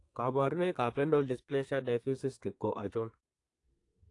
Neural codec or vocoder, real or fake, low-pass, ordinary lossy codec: codec, 32 kHz, 1.9 kbps, SNAC; fake; 10.8 kHz; AAC, 48 kbps